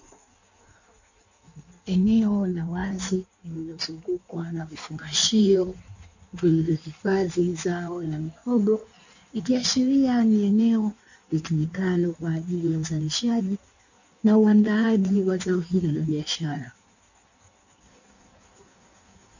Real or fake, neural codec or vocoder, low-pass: fake; codec, 16 kHz in and 24 kHz out, 1.1 kbps, FireRedTTS-2 codec; 7.2 kHz